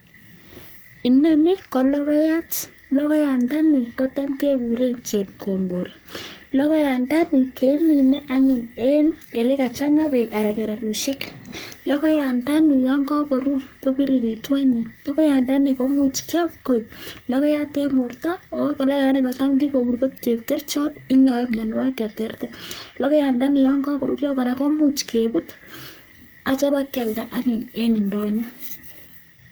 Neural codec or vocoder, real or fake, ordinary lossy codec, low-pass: codec, 44.1 kHz, 3.4 kbps, Pupu-Codec; fake; none; none